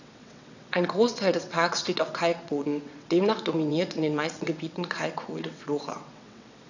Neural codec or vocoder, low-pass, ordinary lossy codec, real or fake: vocoder, 22.05 kHz, 80 mel bands, WaveNeXt; 7.2 kHz; none; fake